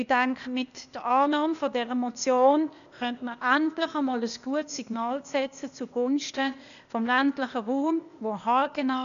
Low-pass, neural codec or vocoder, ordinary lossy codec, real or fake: 7.2 kHz; codec, 16 kHz, 0.8 kbps, ZipCodec; none; fake